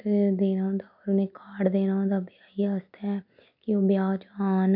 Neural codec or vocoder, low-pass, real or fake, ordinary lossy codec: none; 5.4 kHz; real; none